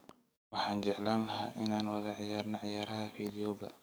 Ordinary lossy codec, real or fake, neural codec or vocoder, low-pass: none; fake; codec, 44.1 kHz, 7.8 kbps, DAC; none